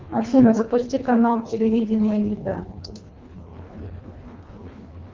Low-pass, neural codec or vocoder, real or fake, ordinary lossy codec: 7.2 kHz; codec, 24 kHz, 1.5 kbps, HILCodec; fake; Opus, 24 kbps